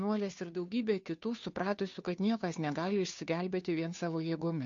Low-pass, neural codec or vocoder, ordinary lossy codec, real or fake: 7.2 kHz; codec, 16 kHz, 2 kbps, FunCodec, trained on Chinese and English, 25 frames a second; AAC, 64 kbps; fake